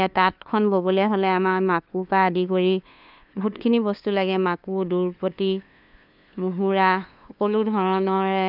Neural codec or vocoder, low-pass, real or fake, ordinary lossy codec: codec, 16 kHz, 2 kbps, FunCodec, trained on LibriTTS, 25 frames a second; 5.4 kHz; fake; none